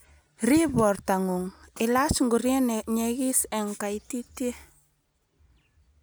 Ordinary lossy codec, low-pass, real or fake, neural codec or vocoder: none; none; real; none